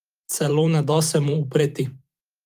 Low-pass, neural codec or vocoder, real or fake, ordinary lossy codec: 14.4 kHz; vocoder, 44.1 kHz, 128 mel bands every 512 samples, BigVGAN v2; fake; Opus, 32 kbps